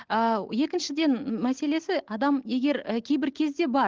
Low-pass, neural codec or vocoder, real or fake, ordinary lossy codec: 7.2 kHz; none; real; Opus, 16 kbps